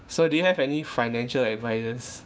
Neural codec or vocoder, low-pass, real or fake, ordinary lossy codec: codec, 16 kHz, 6 kbps, DAC; none; fake; none